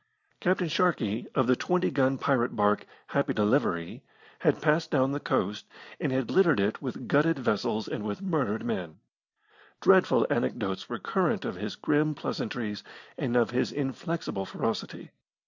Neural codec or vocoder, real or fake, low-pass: none; real; 7.2 kHz